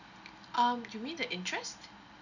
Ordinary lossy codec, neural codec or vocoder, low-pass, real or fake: none; none; 7.2 kHz; real